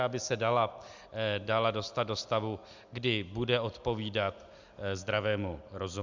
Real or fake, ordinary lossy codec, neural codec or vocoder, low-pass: real; Opus, 64 kbps; none; 7.2 kHz